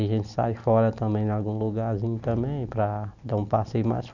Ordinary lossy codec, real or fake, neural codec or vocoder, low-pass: none; real; none; 7.2 kHz